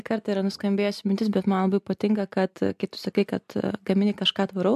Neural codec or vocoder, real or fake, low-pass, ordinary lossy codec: none; real; 14.4 kHz; MP3, 96 kbps